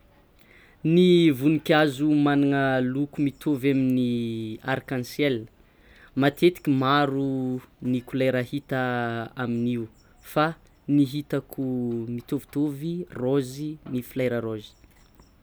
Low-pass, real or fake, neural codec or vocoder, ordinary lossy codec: none; real; none; none